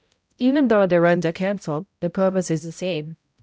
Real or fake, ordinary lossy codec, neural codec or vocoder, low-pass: fake; none; codec, 16 kHz, 0.5 kbps, X-Codec, HuBERT features, trained on balanced general audio; none